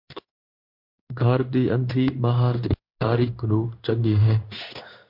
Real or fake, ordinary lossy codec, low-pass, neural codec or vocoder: fake; MP3, 48 kbps; 5.4 kHz; codec, 16 kHz in and 24 kHz out, 1 kbps, XY-Tokenizer